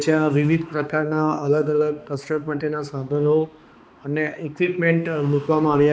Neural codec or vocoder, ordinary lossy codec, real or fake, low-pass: codec, 16 kHz, 2 kbps, X-Codec, HuBERT features, trained on balanced general audio; none; fake; none